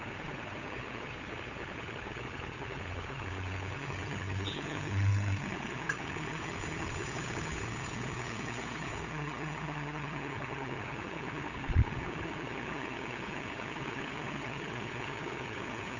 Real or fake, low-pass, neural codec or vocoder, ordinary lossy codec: fake; 7.2 kHz; codec, 16 kHz, 8 kbps, FunCodec, trained on LibriTTS, 25 frames a second; none